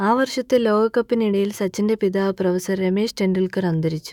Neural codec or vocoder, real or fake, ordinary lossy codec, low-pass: autoencoder, 48 kHz, 128 numbers a frame, DAC-VAE, trained on Japanese speech; fake; none; 19.8 kHz